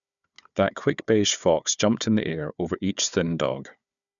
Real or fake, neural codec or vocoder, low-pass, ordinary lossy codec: fake; codec, 16 kHz, 4 kbps, FunCodec, trained on Chinese and English, 50 frames a second; 7.2 kHz; none